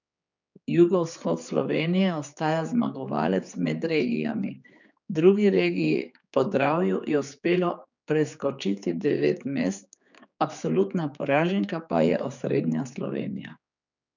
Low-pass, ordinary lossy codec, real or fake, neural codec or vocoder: 7.2 kHz; none; fake; codec, 16 kHz, 4 kbps, X-Codec, HuBERT features, trained on general audio